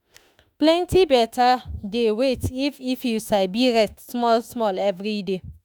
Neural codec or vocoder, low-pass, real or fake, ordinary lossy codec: autoencoder, 48 kHz, 32 numbers a frame, DAC-VAE, trained on Japanese speech; none; fake; none